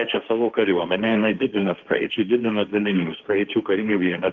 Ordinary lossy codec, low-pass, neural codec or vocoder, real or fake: Opus, 32 kbps; 7.2 kHz; codec, 16 kHz, 1.1 kbps, Voila-Tokenizer; fake